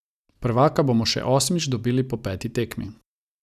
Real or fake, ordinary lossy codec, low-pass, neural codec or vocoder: real; none; 14.4 kHz; none